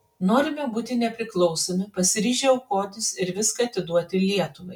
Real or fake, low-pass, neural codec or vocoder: real; 19.8 kHz; none